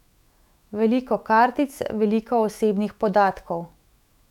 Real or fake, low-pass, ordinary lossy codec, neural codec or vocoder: fake; 19.8 kHz; none; autoencoder, 48 kHz, 128 numbers a frame, DAC-VAE, trained on Japanese speech